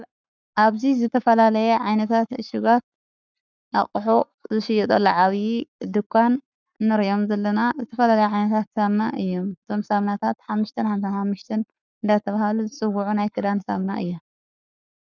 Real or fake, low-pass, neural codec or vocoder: fake; 7.2 kHz; codec, 44.1 kHz, 7.8 kbps, Pupu-Codec